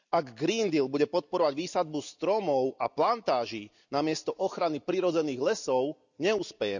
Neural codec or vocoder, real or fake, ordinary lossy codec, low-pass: none; real; none; 7.2 kHz